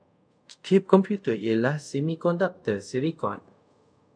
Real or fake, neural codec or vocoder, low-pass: fake; codec, 24 kHz, 0.5 kbps, DualCodec; 9.9 kHz